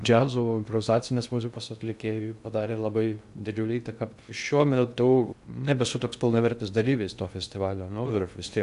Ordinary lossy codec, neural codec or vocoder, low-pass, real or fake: AAC, 96 kbps; codec, 16 kHz in and 24 kHz out, 0.6 kbps, FocalCodec, streaming, 2048 codes; 10.8 kHz; fake